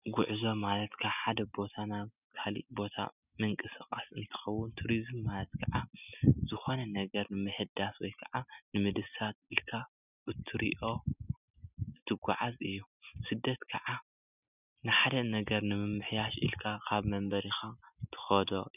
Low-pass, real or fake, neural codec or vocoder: 3.6 kHz; real; none